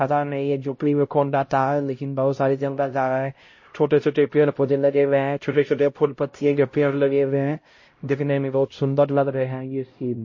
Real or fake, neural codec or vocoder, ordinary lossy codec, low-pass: fake; codec, 16 kHz, 0.5 kbps, X-Codec, HuBERT features, trained on LibriSpeech; MP3, 32 kbps; 7.2 kHz